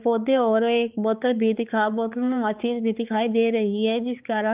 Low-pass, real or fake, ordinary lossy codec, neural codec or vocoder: 3.6 kHz; fake; Opus, 32 kbps; codec, 16 kHz, 4 kbps, X-Codec, HuBERT features, trained on balanced general audio